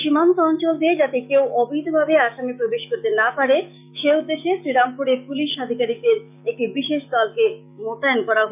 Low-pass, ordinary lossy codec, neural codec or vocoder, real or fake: 3.6 kHz; none; autoencoder, 48 kHz, 128 numbers a frame, DAC-VAE, trained on Japanese speech; fake